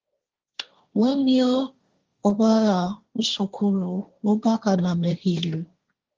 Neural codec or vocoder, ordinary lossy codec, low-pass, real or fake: codec, 16 kHz, 1.1 kbps, Voila-Tokenizer; Opus, 32 kbps; 7.2 kHz; fake